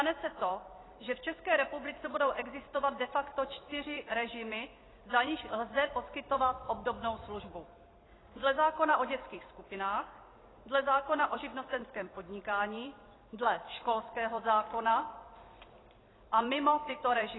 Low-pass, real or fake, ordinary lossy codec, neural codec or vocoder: 7.2 kHz; fake; AAC, 16 kbps; vocoder, 44.1 kHz, 128 mel bands every 256 samples, BigVGAN v2